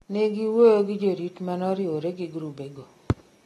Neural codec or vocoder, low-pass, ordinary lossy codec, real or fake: none; 19.8 kHz; AAC, 32 kbps; real